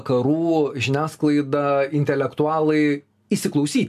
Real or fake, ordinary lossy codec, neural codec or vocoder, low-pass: real; AAC, 96 kbps; none; 14.4 kHz